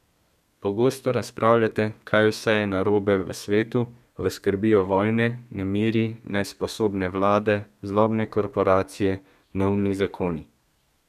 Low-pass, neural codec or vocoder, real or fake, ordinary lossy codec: 14.4 kHz; codec, 32 kHz, 1.9 kbps, SNAC; fake; none